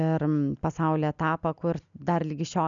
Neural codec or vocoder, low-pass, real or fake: none; 7.2 kHz; real